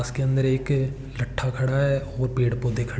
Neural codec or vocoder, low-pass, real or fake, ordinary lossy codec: none; none; real; none